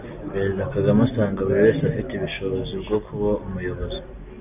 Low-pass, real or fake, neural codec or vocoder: 3.6 kHz; real; none